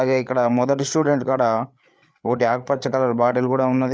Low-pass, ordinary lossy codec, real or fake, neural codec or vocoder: none; none; fake; codec, 16 kHz, 8 kbps, FreqCodec, larger model